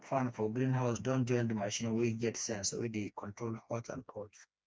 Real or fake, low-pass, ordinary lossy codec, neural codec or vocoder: fake; none; none; codec, 16 kHz, 2 kbps, FreqCodec, smaller model